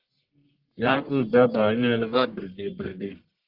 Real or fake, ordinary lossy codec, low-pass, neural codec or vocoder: fake; Opus, 24 kbps; 5.4 kHz; codec, 44.1 kHz, 1.7 kbps, Pupu-Codec